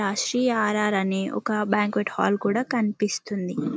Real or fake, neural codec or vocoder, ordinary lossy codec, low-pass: real; none; none; none